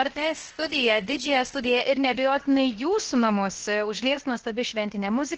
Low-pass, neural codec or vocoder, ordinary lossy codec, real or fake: 7.2 kHz; codec, 16 kHz, 0.7 kbps, FocalCodec; Opus, 16 kbps; fake